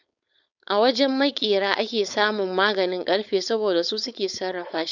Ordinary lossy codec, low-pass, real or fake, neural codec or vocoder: none; 7.2 kHz; fake; codec, 16 kHz, 4.8 kbps, FACodec